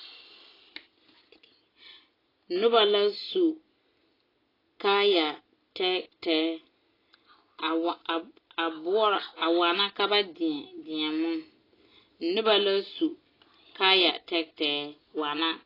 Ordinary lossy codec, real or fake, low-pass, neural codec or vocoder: AAC, 24 kbps; real; 5.4 kHz; none